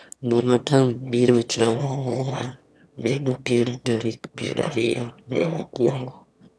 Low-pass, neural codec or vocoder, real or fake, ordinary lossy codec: none; autoencoder, 22.05 kHz, a latent of 192 numbers a frame, VITS, trained on one speaker; fake; none